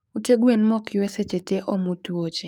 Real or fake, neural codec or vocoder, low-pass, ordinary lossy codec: fake; codec, 44.1 kHz, 7.8 kbps, DAC; 19.8 kHz; none